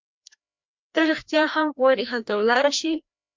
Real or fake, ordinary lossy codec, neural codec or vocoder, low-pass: fake; MP3, 64 kbps; codec, 16 kHz, 1 kbps, FreqCodec, larger model; 7.2 kHz